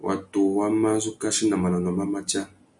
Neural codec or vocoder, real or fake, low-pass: none; real; 10.8 kHz